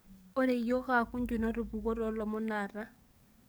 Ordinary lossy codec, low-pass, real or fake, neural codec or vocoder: none; none; fake; codec, 44.1 kHz, 7.8 kbps, DAC